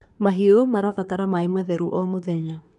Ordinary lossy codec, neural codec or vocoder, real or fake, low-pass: none; codec, 24 kHz, 1 kbps, SNAC; fake; 10.8 kHz